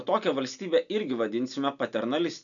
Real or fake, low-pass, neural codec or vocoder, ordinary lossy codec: real; 7.2 kHz; none; MP3, 96 kbps